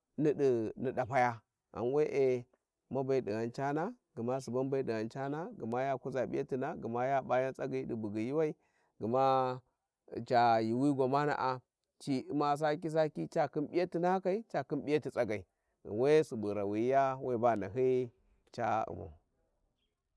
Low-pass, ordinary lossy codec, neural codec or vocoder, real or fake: none; none; none; real